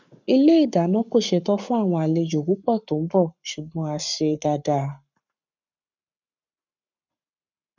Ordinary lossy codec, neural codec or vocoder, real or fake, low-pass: none; codec, 44.1 kHz, 7.8 kbps, Pupu-Codec; fake; 7.2 kHz